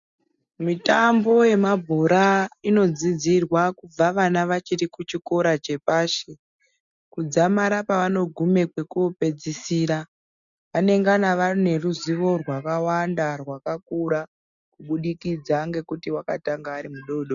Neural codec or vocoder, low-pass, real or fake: none; 7.2 kHz; real